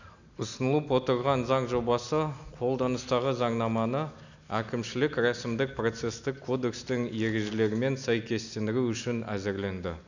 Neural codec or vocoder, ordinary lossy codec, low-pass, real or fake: none; none; 7.2 kHz; real